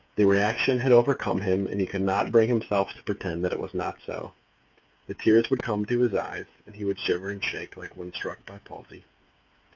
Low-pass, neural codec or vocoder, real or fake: 7.2 kHz; codec, 16 kHz, 8 kbps, FreqCodec, smaller model; fake